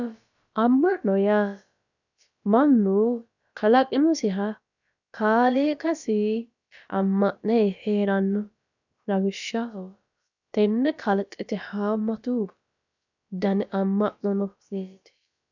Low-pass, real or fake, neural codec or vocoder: 7.2 kHz; fake; codec, 16 kHz, about 1 kbps, DyCAST, with the encoder's durations